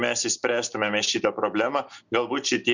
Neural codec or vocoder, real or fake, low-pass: none; real; 7.2 kHz